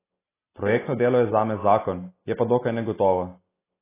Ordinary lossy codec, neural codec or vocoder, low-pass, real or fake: AAC, 16 kbps; none; 3.6 kHz; real